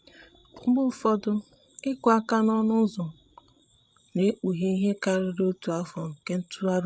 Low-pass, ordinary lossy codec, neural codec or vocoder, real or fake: none; none; codec, 16 kHz, 16 kbps, FreqCodec, larger model; fake